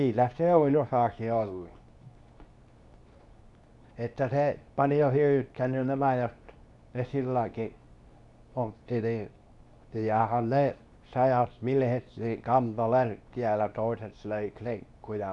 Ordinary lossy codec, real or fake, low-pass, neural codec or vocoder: none; fake; 10.8 kHz; codec, 24 kHz, 0.9 kbps, WavTokenizer, medium speech release version 2